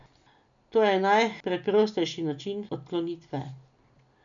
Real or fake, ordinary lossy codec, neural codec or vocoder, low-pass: real; none; none; 7.2 kHz